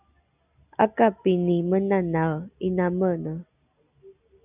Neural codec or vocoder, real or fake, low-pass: none; real; 3.6 kHz